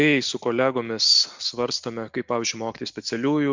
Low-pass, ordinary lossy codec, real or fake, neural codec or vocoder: 7.2 kHz; MP3, 64 kbps; real; none